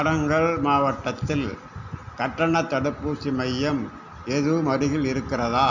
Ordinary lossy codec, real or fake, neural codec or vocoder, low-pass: none; real; none; 7.2 kHz